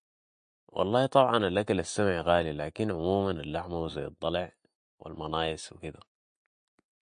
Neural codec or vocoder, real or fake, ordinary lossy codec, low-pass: none; real; MP3, 48 kbps; 9.9 kHz